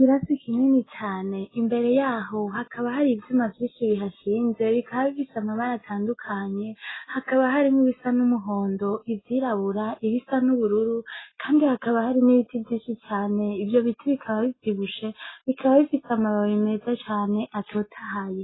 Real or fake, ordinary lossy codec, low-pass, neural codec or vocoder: real; AAC, 16 kbps; 7.2 kHz; none